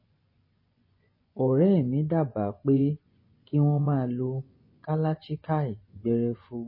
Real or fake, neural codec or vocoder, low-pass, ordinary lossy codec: fake; vocoder, 24 kHz, 100 mel bands, Vocos; 5.4 kHz; MP3, 24 kbps